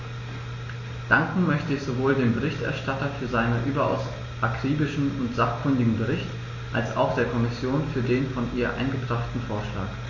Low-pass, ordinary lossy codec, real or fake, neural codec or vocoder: 7.2 kHz; MP3, 32 kbps; real; none